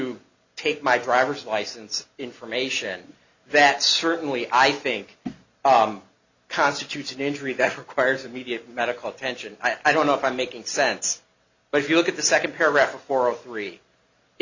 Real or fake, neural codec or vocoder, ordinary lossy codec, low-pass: real; none; Opus, 64 kbps; 7.2 kHz